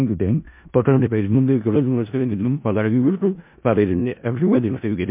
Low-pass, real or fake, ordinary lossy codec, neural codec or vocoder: 3.6 kHz; fake; MP3, 32 kbps; codec, 16 kHz in and 24 kHz out, 0.4 kbps, LongCat-Audio-Codec, four codebook decoder